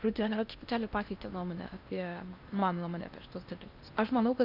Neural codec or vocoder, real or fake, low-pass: codec, 16 kHz in and 24 kHz out, 0.8 kbps, FocalCodec, streaming, 65536 codes; fake; 5.4 kHz